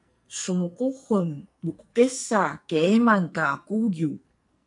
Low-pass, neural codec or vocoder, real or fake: 10.8 kHz; codec, 44.1 kHz, 2.6 kbps, SNAC; fake